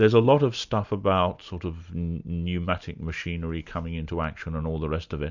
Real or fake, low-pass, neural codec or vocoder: real; 7.2 kHz; none